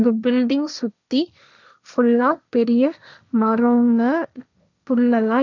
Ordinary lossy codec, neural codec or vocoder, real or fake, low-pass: none; codec, 16 kHz, 1.1 kbps, Voila-Tokenizer; fake; 7.2 kHz